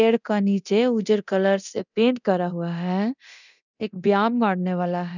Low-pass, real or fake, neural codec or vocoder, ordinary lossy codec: 7.2 kHz; fake; codec, 24 kHz, 0.9 kbps, DualCodec; none